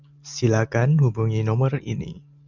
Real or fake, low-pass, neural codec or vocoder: real; 7.2 kHz; none